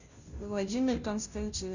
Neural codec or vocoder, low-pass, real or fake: codec, 16 kHz, 0.5 kbps, FunCodec, trained on Chinese and English, 25 frames a second; 7.2 kHz; fake